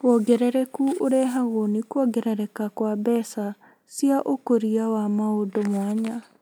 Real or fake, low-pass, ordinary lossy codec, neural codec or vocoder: real; none; none; none